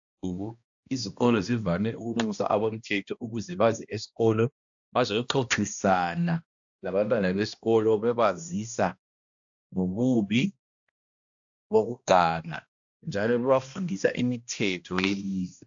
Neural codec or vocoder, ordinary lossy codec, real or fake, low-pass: codec, 16 kHz, 1 kbps, X-Codec, HuBERT features, trained on balanced general audio; AAC, 64 kbps; fake; 7.2 kHz